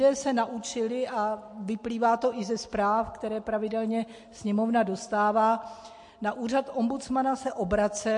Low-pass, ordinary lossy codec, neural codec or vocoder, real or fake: 10.8 kHz; MP3, 48 kbps; none; real